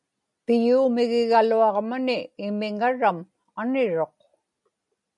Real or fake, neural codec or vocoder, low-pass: real; none; 10.8 kHz